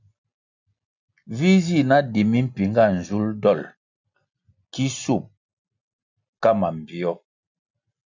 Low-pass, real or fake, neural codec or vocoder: 7.2 kHz; real; none